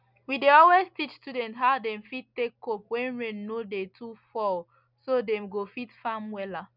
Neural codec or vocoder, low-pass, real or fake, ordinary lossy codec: none; 5.4 kHz; real; none